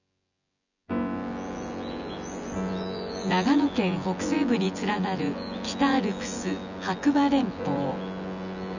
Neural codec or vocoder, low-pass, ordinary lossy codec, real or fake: vocoder, 24 kHz, 100 mel bands, Vocos; 7.2 kHz; none; fake